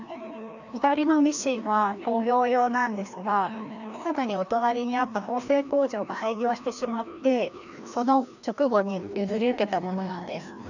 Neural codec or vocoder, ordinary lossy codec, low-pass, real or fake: codec, 16 kHz, 1 kbps, FreqCodec, larger model; none; 7.2 kHz; fake